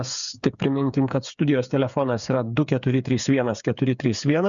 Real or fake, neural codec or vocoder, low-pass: fake; codec, 16 kHz, 8 kbps, FreqCodec, smaller model; 7.2 kHz